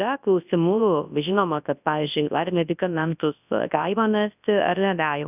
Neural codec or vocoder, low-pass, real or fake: codec, 24 kHz, 0.9 kbps, WavTokenizer, large speech release; 3.6 kHz; fake